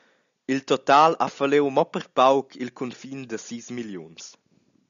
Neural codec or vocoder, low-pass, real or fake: none; 7.2 kHz; real